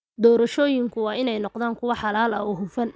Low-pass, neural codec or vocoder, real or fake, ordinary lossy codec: none; none; real; none